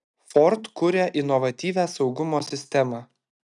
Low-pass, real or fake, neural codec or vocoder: 10.8 kHz; real; none